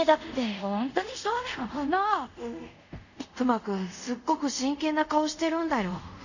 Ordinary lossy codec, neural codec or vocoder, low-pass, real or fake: none; codec, 24 kHz, 0.5 kbps, DualCodec; 7.2 kHz; fake